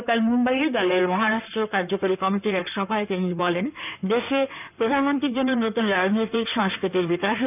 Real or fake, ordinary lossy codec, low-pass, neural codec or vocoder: fake; none; 3.6 kHz; codec, 16 kHz in and 24 kHz out, 2.2 kbps, FireRedTTS-2 codec